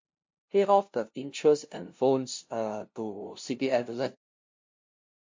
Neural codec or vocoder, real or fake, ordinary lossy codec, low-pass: codec, 16 kHz, 0.5 kbps, FunCodec, trained on LibriTTS, 25 frames a second; fake; MP3, 48 kbps; 7.2 kHz